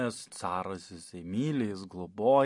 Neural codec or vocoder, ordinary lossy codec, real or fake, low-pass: none; MP3, 48 kbps; real; 10.8 kHz